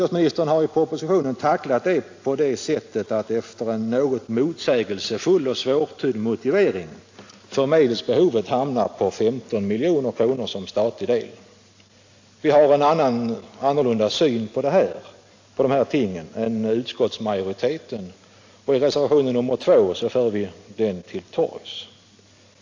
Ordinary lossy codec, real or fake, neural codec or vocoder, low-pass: AAC, 48 kbps; real; none; 7.2 kHz